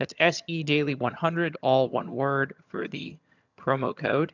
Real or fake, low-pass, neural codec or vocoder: fake; 7.2 kHz; vocoder, 22.05 kHz, 80 mel bands, HiFi-GAN